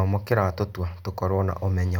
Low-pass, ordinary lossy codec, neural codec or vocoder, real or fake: 19.8 kHz; none; none; real